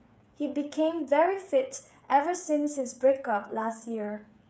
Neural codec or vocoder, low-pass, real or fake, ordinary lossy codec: codec, 16 kHz, 8 kbps, FreqCodec, smaller model; none; fake; none